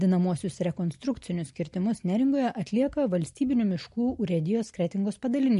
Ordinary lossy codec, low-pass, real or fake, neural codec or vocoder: MP3, 48 kbps; 10.8 kHz; real; none